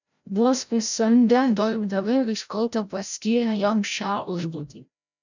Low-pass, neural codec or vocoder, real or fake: 7.2 kHz; codec, 16 kHz, 0.5 kbps, FreqCodec, larger model; fake